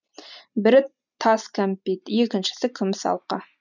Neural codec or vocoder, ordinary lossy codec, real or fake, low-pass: none; none; real; 7.2 kHz